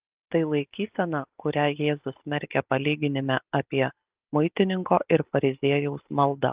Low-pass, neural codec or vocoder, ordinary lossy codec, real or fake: 3.6 kHz; none; Opus, 32 kbps; real